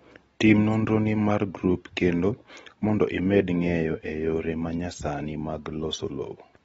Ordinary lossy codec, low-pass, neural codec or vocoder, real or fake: AAC, 24 kbps; 19.8 kHz; none; real